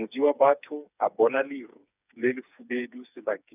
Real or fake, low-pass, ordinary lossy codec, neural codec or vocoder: fake; 3.6 kHz; none; codec, 16 kHz, 4 kbps, FreqCodec, smaller model